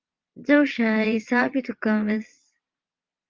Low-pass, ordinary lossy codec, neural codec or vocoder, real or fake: 7.2 kHz; Opus, 32 kbps; vocoder, 22.05 kHz, 80 mel bands, Vocos; fake